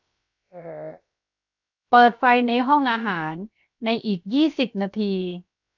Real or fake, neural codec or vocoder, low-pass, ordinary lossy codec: fake; codec, 16 kHz, 0.7 kbps, FocalCodec; 7.2 kHz; none